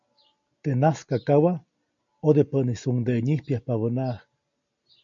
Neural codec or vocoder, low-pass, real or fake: none; 7.2 kHz; real